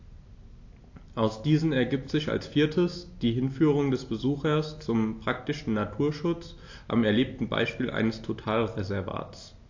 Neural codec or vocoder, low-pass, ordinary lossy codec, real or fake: none; 7.2 kHz; AAC, 48 kbps; real